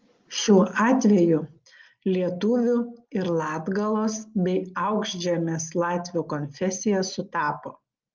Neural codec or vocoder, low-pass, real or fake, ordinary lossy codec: none; 7.2 kHz; real; Opus, 32 kbps